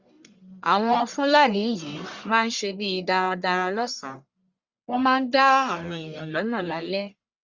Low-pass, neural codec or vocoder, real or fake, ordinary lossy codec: 7.2 kHz; codec, 44.1 kHz, 1.7 kbps, Pupu-Codec; fake; Opus, 64 kbps